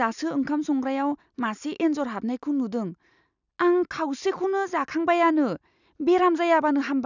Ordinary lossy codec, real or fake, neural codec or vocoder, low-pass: none; real; none; 7.2 kHz